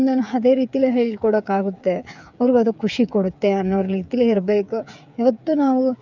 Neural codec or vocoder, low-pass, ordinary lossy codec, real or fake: codec, 16 kHz, 8 kbps, FreqCodec, smaller model; 7.2 kHz; none; fake